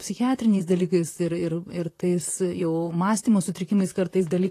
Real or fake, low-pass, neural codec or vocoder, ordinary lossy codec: fake; 14.4 kHz; vocoder, 44.1 kHz, 128 mel bands, Pupu-Vocoder; AAC, 48 kbps